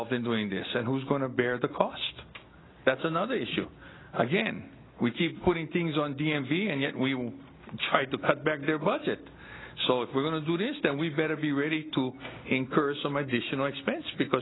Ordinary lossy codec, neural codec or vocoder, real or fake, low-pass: AAC, 16 kbps; none; real; 7.2 kHz